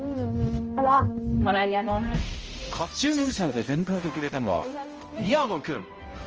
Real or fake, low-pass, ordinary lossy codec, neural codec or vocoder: fake; 7.2 kHz; Opus, 24 kbps; codec, 16 kHz, 0.5 kbps, X-Codec, HuBERT features, trained on balanced general audio